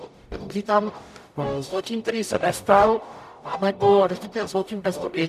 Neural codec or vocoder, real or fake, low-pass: codec, 44.1 kHz, 0.9 kbps, DAC; fake; 14.4 kHz